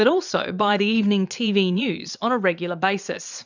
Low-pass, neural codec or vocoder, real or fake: 7.2 kHz; vocoder, 22.05 kHz, 80 mel bands, Vocos; fake